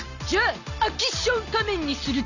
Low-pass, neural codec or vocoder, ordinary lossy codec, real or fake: 7.2 kHz; none; none; real